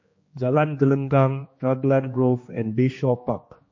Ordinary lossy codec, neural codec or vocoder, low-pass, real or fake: MP3, 32 kbps; codec, 16 kHz, 2 kbps, X-Codec, HuBERT features, trained on general audio; 7.2 kHz; fake